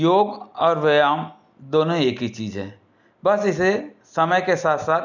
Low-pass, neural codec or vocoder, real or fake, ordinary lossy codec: 7.2 kHz; none; real; none